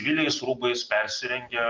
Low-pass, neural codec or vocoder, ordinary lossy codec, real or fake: 7.2 kHz; none; Opus, 32 kbps; real